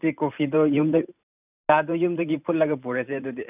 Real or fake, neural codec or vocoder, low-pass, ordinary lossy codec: fake; vocoder, 44.1 kHz, 128 mel bands every 512 samples, BigVGAN v2; 3.6 kHz; none